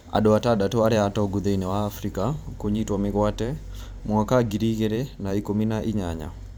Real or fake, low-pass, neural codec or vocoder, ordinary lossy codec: fake; none; vocoder, 44.1 kHz, 128 mel bands every 512 samples, BigVGAN v2; none